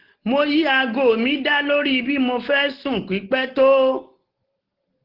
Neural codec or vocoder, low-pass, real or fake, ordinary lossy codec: none; 5.4 kHz; real; Opus, 16 kbps